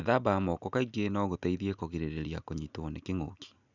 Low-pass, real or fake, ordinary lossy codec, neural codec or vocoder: 7.2 kHz; real; none; none